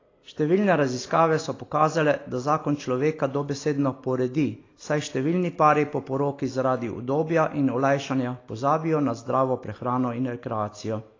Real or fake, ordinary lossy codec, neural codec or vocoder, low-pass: real; AAC, 32 kbps; none; 7.2 kHz